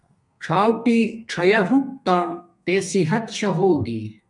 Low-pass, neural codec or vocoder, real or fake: 10.8 kHz; codec, 32 kHz, 1.9 kbps, SNAC; fake